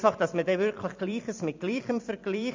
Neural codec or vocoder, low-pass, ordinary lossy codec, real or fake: vocoder, 44.1 kHz, 128 mel bands every 256 samples, BigVGAN v2; 7.2 kHz; MP3, 64 kbps; fake